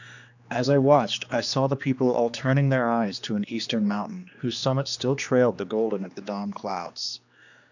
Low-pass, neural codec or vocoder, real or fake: 7.2 kHz; codec, 16 kHz, 2 kbps, X-Codec, HuBERT features, trained on general audio; fake